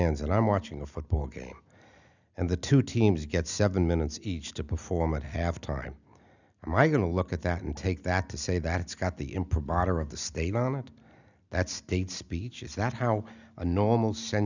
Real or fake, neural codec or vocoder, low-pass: real; none; 7.2 kHz